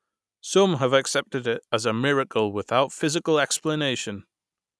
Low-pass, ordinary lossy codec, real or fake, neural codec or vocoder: none; none; real; none